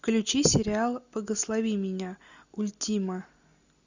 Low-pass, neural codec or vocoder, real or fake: 7.2 kHz; none; real